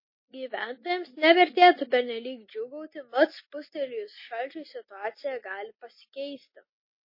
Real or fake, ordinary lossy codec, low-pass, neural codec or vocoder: real; MP3, 24 kbps; 5.4 kHz; none